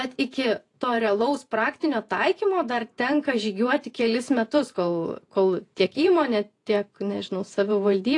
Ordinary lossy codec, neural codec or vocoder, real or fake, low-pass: AAC, 48 kbps; vocoder, 48 kHz, 128 mel bands, Vocos; fake; 10.8 kHz